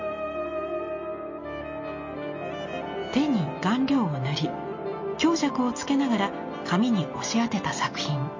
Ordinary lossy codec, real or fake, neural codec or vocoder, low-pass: MP3, 32 kbps; real; none; 7.2 kHz